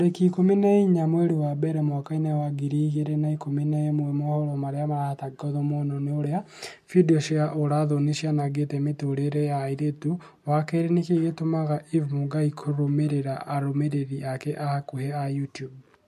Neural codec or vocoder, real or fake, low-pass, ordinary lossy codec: none; real; 14.4 kHz; MP3, 64 kbps